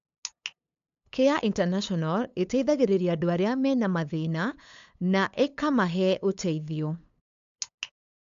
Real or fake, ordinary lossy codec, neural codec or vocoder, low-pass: fake; AAC, 64 kbps; codec, 16 kHz, 8 kbps, FunCodec, trained on LibriTTS, 25 frames a second; 7.2 kHz